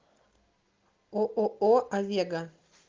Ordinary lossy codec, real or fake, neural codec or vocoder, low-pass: Opus, 32 kbps; real; none; 7.2 kHz